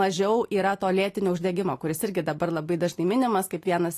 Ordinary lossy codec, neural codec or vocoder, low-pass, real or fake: AAC, 48 kbps; none; 14.4 kHz; real